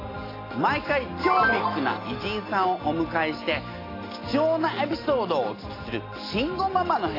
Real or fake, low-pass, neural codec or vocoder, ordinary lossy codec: real; 5.4 kHz; none; AAC, 24 kbps